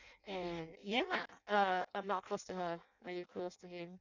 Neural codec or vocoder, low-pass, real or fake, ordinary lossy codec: codec, 16 kHz in and 24 kHz out, 0.6 kbps, FireRedTTS-2 codec; 7.2 kHz; fake; none